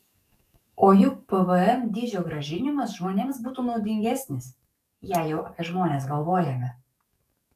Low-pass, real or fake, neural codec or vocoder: 14.4 kHz; fake; codec, 44.1 kHz, 7.8 kbps, DAC